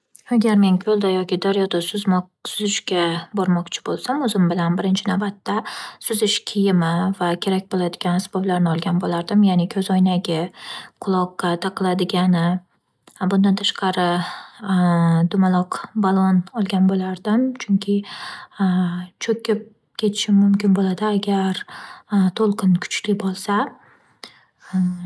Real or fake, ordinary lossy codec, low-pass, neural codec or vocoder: real; none; none; none